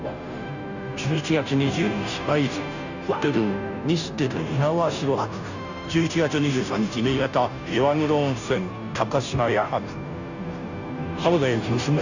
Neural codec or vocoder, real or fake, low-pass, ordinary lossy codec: codec, 16 kHz, 0.5 kbps, FunCodec, trained on Chinese and English, 25 frames a second; fake; 7.2 kHz; none